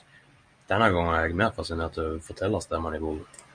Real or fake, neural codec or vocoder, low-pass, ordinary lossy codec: real; none; 9.9 kHz; Opus, 64 kbps